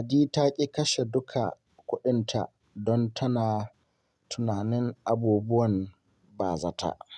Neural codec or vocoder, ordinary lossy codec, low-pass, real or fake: none; none; none; real